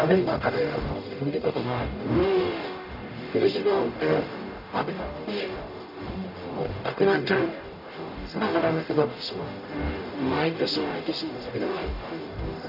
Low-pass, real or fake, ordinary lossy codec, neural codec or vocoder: 5.4 kHz; fake; none; codec, 44.1 kHz, 0.9 kbps, DAC